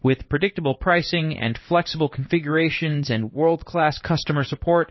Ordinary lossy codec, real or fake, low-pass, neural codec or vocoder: MP3, 24 kbps; fake; 7.2 kHz; codec, 16 kHz in and 24 kHz out, 1 kbps, XY-Tokenizer